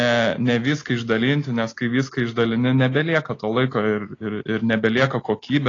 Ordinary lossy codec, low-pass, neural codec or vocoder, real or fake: AAC, 32 kbps; 7.2 kHz; none; real